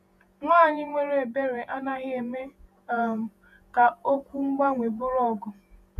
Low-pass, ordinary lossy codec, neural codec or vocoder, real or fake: 14.4 kHz; none; vocoder, 48 kHz, 128 mel bands, Vocos; fake